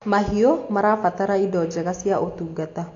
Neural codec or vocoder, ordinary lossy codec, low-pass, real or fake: none; AAC, 48 kbps; 7.2 kHz; real